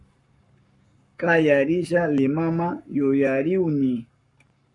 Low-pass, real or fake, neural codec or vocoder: 10.8 kHz; fake; codec, 44.1 kHz, 7.8 kbps, Pupu-Codec